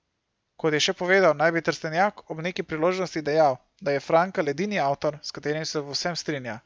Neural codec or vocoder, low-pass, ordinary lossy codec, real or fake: none; none; none; real